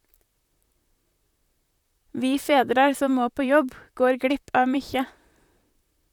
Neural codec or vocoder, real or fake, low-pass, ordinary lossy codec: vocoder, 44.1 kHz, 128 mel bands, Pupu-Vocoder; fake; 19.8 kHz; none